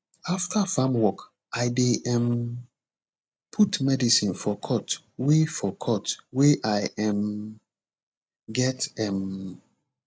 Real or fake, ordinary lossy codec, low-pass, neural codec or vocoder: real; none; none; none